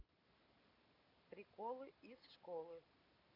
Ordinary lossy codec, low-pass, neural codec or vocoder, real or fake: none; 5.4 kHz; none; real